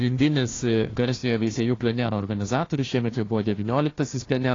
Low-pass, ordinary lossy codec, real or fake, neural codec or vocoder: 7.2 kHz; AAC, 32 kbps; fake; codec, 16 kHz, 1 kbps, FunCodec, trained on Chinese and English, 50 frames a second